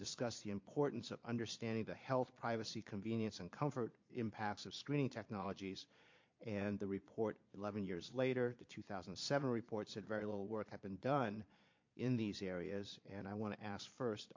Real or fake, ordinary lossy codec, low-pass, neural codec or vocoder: fake; MP3, 48 kbps; 7.2 kHz; vocoder, 22.05 kHz, 80 mel bands, Vocos